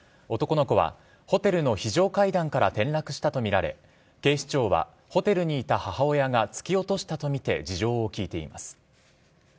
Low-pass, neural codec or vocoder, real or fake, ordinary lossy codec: none; none; real; none